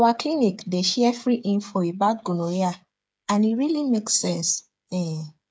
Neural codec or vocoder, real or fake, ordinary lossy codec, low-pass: codec, 16 kHz, 8 kbps, FreqCodec, smaller model; fake; none; none